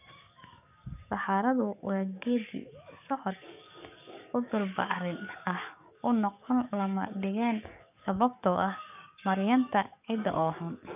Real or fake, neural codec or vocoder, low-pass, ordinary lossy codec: fake; codec, 44.1 kHz, 7.8 kbps, DAC; 3.6 kHz; none